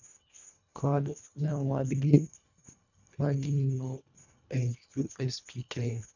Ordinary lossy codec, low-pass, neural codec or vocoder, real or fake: none; 7.2 kHz; codec, 24 kHz, 1.5 kbps, HILCodec; fake